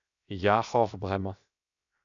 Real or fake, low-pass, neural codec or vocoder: fake; 7.2 kHz; codec, 16 kHz, 0.7 kbps, FocalCodec